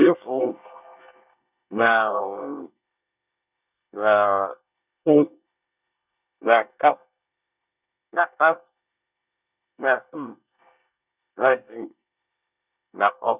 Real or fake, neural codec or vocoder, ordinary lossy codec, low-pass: fake; codec, 24 kHz, 1 kbps, SNAC; none; 3.6 kHz